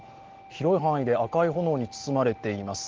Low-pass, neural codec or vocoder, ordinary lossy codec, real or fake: 7.2 kHz; none; Opus, 16 kbps; real